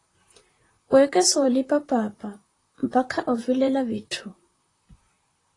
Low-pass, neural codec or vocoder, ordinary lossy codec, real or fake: 10.8 kHz; vocoder, 44.1 kHz, 128 mel bands, Pupu-Vocoder; AAC, 32 kbps; fake